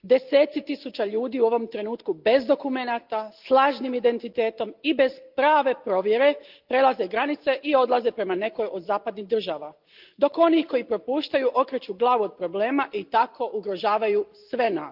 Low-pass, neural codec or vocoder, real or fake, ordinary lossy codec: 5.4 kHz; none; real; Opus, 24 kbps